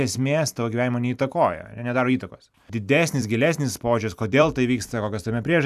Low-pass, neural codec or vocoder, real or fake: 14.4 kHz; vocoder, 44.1 kHz, 128 mel bands every 256 samples, BigVGAN v2; fake